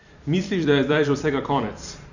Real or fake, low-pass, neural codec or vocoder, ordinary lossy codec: fake; 7.2 kHz; vocoder, 44.1 kHz, 128 mel bands every 256 samples, BigVGAN v2; none